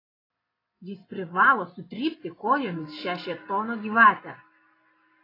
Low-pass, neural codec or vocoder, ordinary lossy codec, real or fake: 5.4 kHz; none; AAC, 24 kbps; real